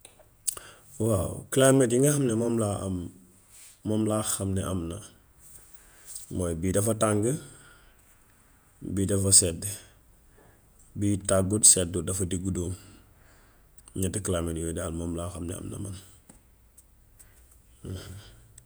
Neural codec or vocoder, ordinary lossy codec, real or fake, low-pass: vocoder, 48 kHz, 128 mel bands, Vocos; none; fake; none